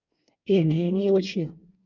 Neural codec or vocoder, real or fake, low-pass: codec, 24 kHz, 1 kbps, SNAC; fake; 7.2 kHz